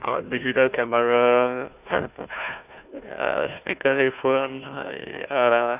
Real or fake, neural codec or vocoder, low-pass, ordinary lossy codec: fake; codec, 16 kHz, 1 kbps, FunCodec, trained on Chinese and English, 50 frames a second; 3.6 kHz; none